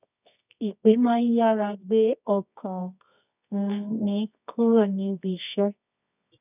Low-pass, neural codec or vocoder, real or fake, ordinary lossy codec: 3.6 kHz; codec, 24 kHz, 0.9 kbps, WavTokenizer, medium music audio release; fake; none